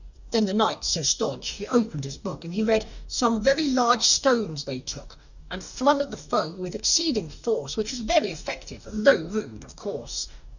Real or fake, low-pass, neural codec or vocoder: fake; 7.2 kHz; codec, 44.1 kHz, 2.6 kbps, DAC